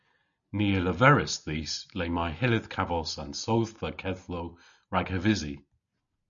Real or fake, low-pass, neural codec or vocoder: real; 7.2 kHz; none